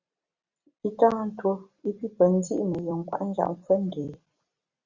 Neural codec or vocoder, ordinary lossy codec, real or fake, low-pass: vocoder, 44.1 kHz, 128 mel bands every 256 samples, BigVGAN v2; Opus, 64 kbps; fake; 7.2 kHz